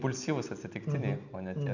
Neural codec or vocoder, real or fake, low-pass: none; real; 7.2 kHz